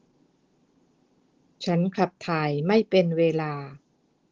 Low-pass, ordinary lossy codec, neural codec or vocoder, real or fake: 7.2 kHz; Opus, 16 kbps; none; real